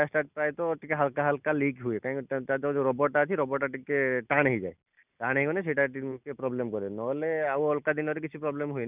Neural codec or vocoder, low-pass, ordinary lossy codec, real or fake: none; 3.6 kHz; none; real